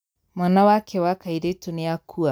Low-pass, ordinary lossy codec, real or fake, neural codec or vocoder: none; none; real; none